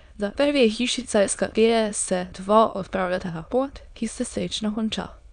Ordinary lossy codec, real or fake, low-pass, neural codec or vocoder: none; fake; 9.9 kHz; autoencoder, 22.05 kHz, a latent of 192 numbers a frame, VITS, trained on many speakers